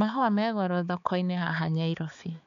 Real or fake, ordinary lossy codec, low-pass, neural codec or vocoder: fake; none; 7.2 kHz; codec, 16 kHz, 4 kbps, X-Codec, HuBERT features, trained on balanced general audio